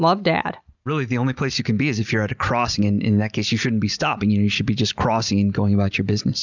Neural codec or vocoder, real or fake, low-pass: none; real; 7.2 kHz